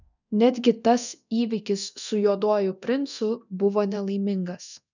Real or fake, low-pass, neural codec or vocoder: fake; 7.2 kHz; codec, 24 kHz, 0.9 kbps, DualCodec